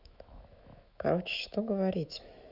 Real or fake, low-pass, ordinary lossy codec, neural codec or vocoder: fake; 5.4 kHz; none; autoencoder, 48 kHz, 128 numbers a frame, DAC-VAE, trained on Japanese speech